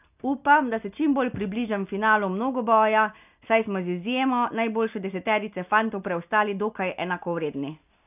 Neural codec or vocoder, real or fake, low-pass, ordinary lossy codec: none; real; 3.6 kHz; none